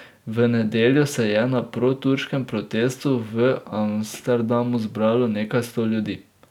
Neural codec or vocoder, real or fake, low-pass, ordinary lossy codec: none; real; 19.8 kHz; none